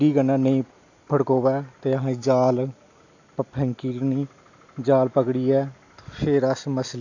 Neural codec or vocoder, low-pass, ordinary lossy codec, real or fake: none; 7.2 kHz; AAC, 48 kbps; real